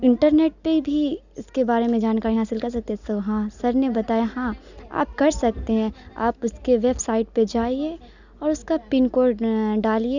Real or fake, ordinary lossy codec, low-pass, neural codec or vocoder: real; none; 7.2 kHz; none